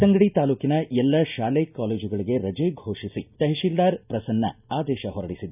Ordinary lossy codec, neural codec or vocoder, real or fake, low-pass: none; none; real; 3.6 kHz